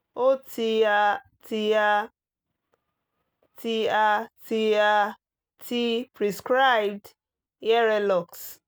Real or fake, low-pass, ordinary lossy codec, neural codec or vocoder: real; none; none; none